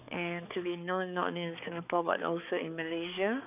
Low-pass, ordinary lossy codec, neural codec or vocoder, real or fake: 3.6 kHz; none; codec, 16 kHz, 4 kbps, X-Codec, HuBERT features, trained on balanced general audio; fake